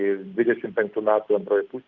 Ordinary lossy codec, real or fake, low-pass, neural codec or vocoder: Opus, 24 kbps; real; 7.2 kHz; none